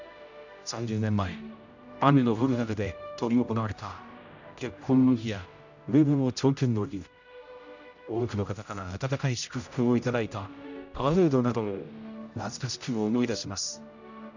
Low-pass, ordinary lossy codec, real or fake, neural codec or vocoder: 7.2 kHz; none; fake; codec, 16 kHz, 0.5 kbps, X-Codec, HuBERT features, trained on general audio